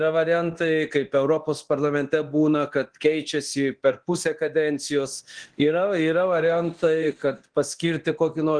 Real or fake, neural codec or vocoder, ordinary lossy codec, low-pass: fake; codec, 24 kHz, 0.9 kbps, DualCodec; Opus, 16 kbps; 9.9 kHz